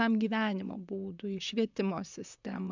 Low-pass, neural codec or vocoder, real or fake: 7.2 kHz; vocoder, 44.1 kHz, 128 mel bands, Pupu-Vocoder; fake